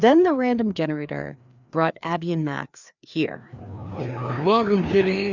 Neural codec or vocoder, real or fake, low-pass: codec, 16 kHz, 2 kbps, FreqCodec, larger model; fake; 7.2 kHz